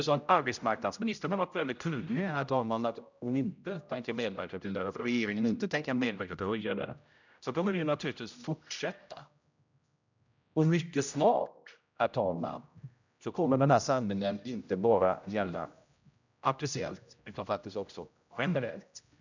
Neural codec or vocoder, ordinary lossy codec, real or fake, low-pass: codec, 16 kHz, 0.5 kbps, X-Codec, HuBERT features, trained on general audio; none; fake; 7.2 kHz